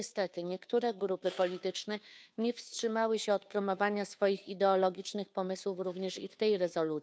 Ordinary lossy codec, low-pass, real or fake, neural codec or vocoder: none; none; fake; codec, 16 kHz, 2 kbps, FunCodec, trained on Chinese and English, 25 frames a second